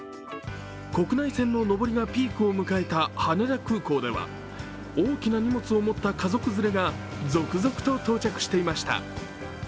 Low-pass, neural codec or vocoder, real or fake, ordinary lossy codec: none; none; real; none